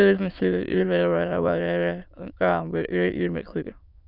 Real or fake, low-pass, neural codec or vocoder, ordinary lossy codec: fake; 5.4 kHz; autoencoder, 22.05 kHz, a latent of 192 numbers a frame, VITS, trained on many speakers; none